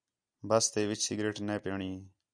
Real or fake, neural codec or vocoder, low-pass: real; none; 9.9 kHz